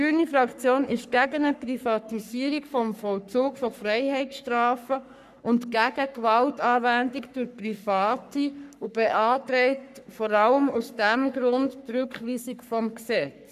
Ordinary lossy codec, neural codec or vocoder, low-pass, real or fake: none; codec, 44.1 kHz, 3.4 kbps, Pupu-Codec; 14.4 kHz; fake